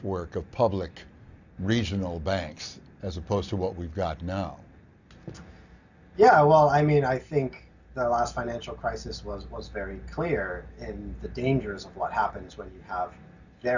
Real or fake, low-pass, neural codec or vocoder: real; 7.2 kHz; none